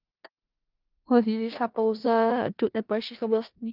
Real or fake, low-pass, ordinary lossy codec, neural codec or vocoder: fake; 5.4 kHz; Opus, 24 kbps; codec, 16 kHz in and 24 kHz out, 0.4 kbps, LongCat-Audio-Codec, four codebook decoder